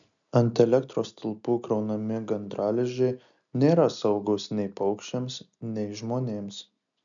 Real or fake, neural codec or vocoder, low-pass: real; none; 7.2 kHz